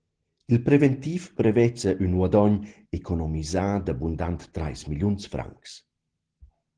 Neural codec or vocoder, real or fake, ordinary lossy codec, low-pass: none; real; Opus, 16 kbps; 9.9 kHz